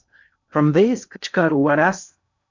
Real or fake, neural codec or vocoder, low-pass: fake; codec, 16 kHz in and 24 kHz out, 0.6 kbps, FocalCodec, streaming, 2048 codes; 7.2 kHz